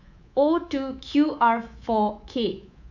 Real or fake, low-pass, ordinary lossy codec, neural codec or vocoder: fake; 7.2 kHz; none; codec, 24 kHz, 3.1 kbps, DualCodec